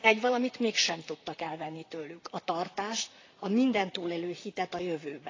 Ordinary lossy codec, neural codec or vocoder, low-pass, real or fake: AAC, 32 kbps; vocoder, 44.1 kHz, 128 mel bands, Pupu-Vocoder; 7.2 kHz; fake